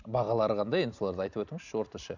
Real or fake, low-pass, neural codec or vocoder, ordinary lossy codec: real; 7.2 kHz; none; none